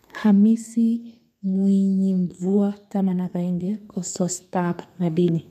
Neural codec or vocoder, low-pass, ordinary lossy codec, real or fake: codec, 32 kHz, 1.9 kbps, SNAC; 14.4 kHz; none; fake